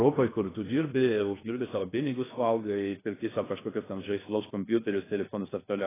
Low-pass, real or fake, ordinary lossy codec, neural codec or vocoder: 3.6 kHz; fake; AAC, 16 kbps; codec, 16 kHz in and 24 kHz out, 0.6 kbps, FocalCodec, streaming, 2048 codes